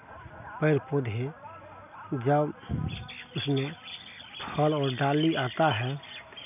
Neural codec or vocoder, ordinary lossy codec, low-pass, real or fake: none; none; 3.6 kHz; real